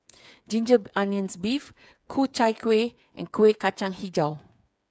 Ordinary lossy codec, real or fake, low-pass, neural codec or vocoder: none; fake; none; codec, 16 kHz, 8 kbps, FreqCodec, smaller model